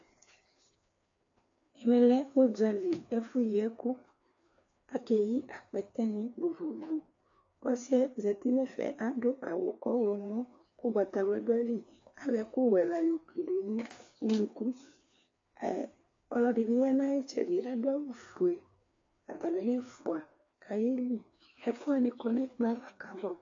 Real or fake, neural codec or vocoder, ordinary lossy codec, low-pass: fake; codec, 16 kHz, 2 kbps, FreqCodec, larger model; AAC, 48 kbps; 7.2 kHz